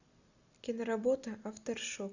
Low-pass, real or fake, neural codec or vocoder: 7.2 kHz; real; none